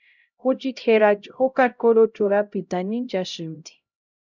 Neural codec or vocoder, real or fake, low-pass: codec, 16 kHz, 0.5 kbps, X-Codec, HuBERT features, trained on LibriSpeech; fake; 7.2 kHz